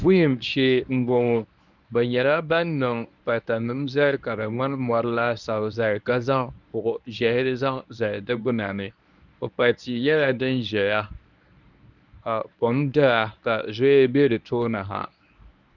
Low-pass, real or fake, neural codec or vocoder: 7.2 kHz; fake; codec, 24 kHz, 0.9 kbps, WavTokenizer, medium speech release version 1